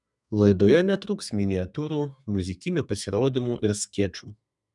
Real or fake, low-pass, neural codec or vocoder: fake; 10.8 kHz; codec, 32 kHz, 1.9 kbps, SNAC